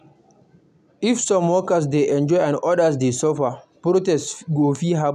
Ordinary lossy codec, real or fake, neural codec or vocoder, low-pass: none; real; none; 14.4 kHz